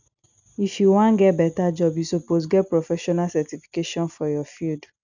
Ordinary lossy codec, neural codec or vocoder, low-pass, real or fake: none; none; 7.2 kHz; real